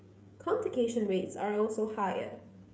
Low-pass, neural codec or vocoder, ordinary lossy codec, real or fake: none; codec, 16 kHz, 16 kbps, FreqCodec, smaller model; none; fake